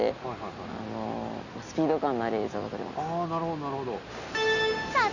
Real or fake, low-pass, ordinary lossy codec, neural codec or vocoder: real; 7.2 kHz; none; none